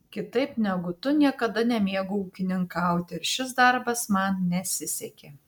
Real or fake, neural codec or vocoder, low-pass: real; none; 19.8 kHz